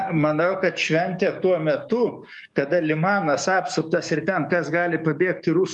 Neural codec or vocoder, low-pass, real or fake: codec, 44.1 kHz, 7.8 kbps, DAC; 10.8 kHz; fake